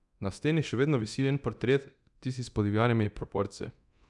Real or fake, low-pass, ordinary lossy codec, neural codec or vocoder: fake; 10.8 kHz; none; codec, 24 kHz, 0.9 kbps, DualCodec